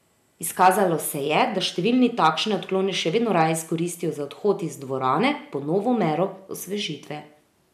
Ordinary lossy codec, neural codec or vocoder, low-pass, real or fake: MP3, 96 kbps; none; 14.4 kHz; real